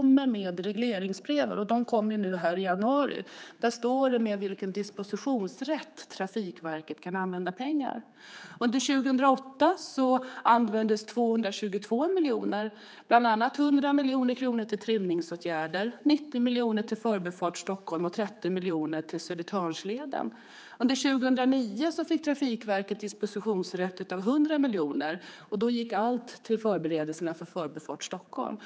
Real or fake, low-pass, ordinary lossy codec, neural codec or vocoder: fake; none; none; codec, 16 kHz, 4 kbps, X-Codec, HuBERT features, trained on general audio